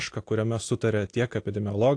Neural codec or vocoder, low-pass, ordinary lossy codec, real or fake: none; 9.9 kHz; AAC, 48 kbps; real